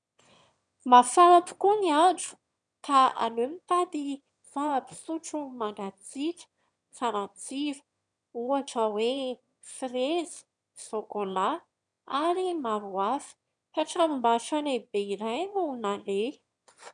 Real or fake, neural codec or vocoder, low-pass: fake; autoencoder, 22.05 kHz, a latent of 192 numbers a frame, VITS, trained on one speaker; 9.9 kHz